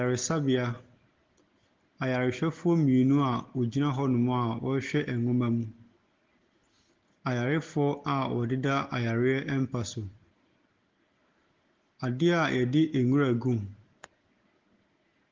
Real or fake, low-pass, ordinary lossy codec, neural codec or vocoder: real; 7.2 kHz; Opus, 16 kbps; none